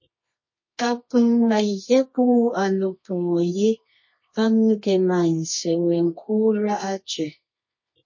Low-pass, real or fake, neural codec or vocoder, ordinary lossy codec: 7.2 kHz; fake; codec, 24 kHz, 0.9 kbps, WavTokenizer, medium music audio release; MP3, 32 kbps